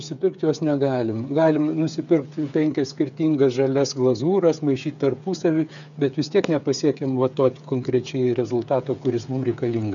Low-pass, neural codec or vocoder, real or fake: 7.2 kHz; codec, 16 kHz, 8 kbps, FreqCodec, smaller model; fake